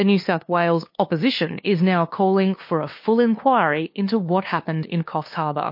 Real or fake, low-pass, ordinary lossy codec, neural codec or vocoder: fake; 5.4 kHz; MP3, 32 kbps; codec, 16 kHz, 2 kbps, FunCodec, trained on LibriTTS, 25 frames a second